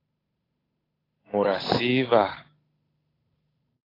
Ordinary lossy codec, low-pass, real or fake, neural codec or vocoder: AAC, 24 kbps; 5.4 kHz; fake; codec, 16 kHz, 8 kbps, FunCodec, trained on Chinese and English, 25 frames a second